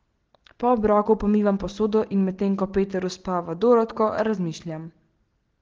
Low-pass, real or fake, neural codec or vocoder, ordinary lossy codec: 7.2 kHz; real; none; Opus, 16 kbps